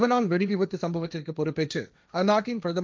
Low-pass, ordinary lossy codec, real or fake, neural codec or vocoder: none; none; fake; codec, 16 kHz, 1.1 kbps, Voila-Tokenizer